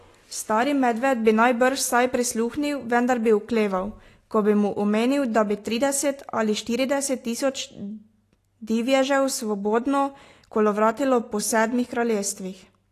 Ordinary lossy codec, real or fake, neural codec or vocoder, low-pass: AAC, 48 kbps; real; none; 14.4 kHz